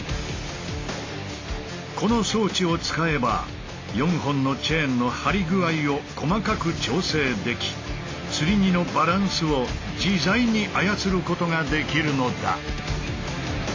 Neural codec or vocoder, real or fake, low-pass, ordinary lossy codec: none; real; 7.2 kHz; AAC, 32 kbps